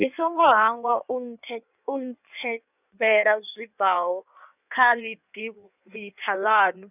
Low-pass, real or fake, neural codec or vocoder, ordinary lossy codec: 3.6 kHz; fake; codec, 16 kHz in and 24 kHz out, 1.1 kbps, FireRedTTS-2 codec; none